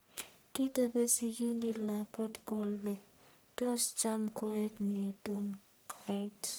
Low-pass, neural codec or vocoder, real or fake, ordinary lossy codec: none; codec, 44.1 kHz, 1.7 kbps, Pupu-Codec; fake; none